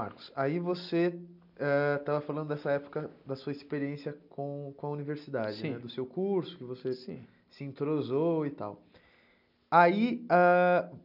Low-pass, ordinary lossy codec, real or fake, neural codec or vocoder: 5.4 kHz; none; real; none